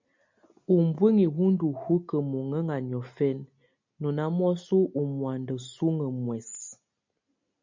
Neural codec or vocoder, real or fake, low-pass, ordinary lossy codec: none; real; 7.2 kHz; MP3, 64 kbps